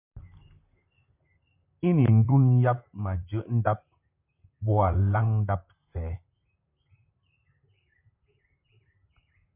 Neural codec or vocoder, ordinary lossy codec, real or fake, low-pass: vocoder, 44.1 kHz, 128 mel bands, Pupu-Vocoder; MP3, 32 kbps; fake; 3.6 kHz